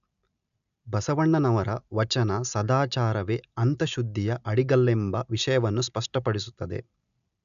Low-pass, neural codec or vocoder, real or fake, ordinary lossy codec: 7.2 kHz; none; real; none